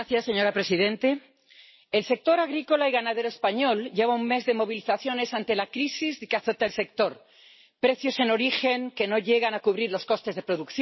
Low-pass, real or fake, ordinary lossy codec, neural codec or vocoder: 7.2 kHz; real; MP3, 24 kbps; none